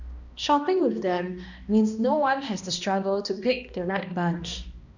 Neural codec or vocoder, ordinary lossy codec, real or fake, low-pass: codec, 16 kHz, 1 kbps, X-Codec, HuBERT features, trained on balanced general audio; none; fake; 7.2 kHz